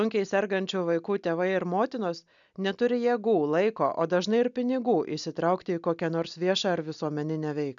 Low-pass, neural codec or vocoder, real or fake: 7.2 kHz; none; real